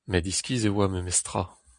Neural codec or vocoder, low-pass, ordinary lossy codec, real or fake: none; 9.9 kHz; MP3, 96 kbps; real